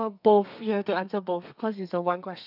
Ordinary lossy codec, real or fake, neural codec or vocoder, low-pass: none; fake; codec, 32 kHz, 1.9 kbps, SNAC; 5.4 kHz